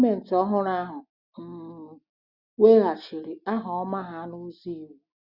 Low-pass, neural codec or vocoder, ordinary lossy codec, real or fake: 5.4 kHz; none; Opus, 64 kbps; real